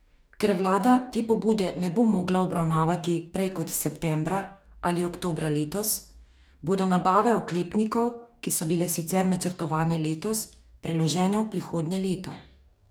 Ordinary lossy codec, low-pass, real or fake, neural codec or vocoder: none; none; fake; codec, 44.1 kHz, 2.6 kbps, DAC